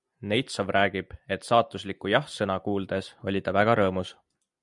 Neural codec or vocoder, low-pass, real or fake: none; 10.8 kHz; real